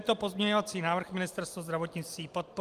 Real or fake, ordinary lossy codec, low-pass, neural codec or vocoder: real; Opus, 32 kbps; 14.4 kHz; none